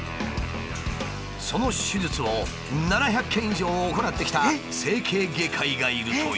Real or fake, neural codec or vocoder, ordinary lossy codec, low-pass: real; none; none; none